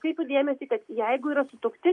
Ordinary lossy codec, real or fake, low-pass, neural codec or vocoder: MP3, 64 kbps; real; 10.8 kHz; none